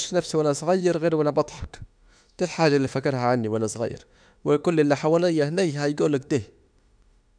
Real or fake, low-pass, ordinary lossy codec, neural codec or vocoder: fake; 9.9 kHz; none; autoencoder, 48 kHz, 32 numbers a frame, DAC-VAE, trained on Japanese speech